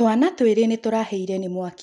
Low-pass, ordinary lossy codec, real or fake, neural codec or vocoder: 10.8 kHz; none; real; none